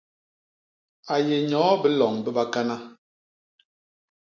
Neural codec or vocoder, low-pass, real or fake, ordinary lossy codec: none; 7.2 kHz; real; MP3, 48 kbps